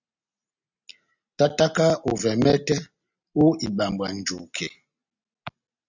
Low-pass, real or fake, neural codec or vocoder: 7.2 kHz; real; none